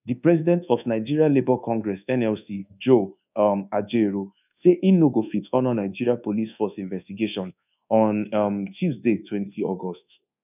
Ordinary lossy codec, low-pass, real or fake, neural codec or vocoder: none; 3.6 kHz; fake; codec, 24 kHz, 1.2 kbps, DualCodec